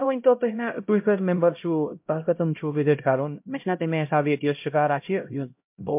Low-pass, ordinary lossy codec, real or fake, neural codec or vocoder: 3.6 kHz; MP3, 32 kbps; fake; codec, 16 kHz, 0.5 kbps, X-Codec, HuBERT features, trained on LibriSpeech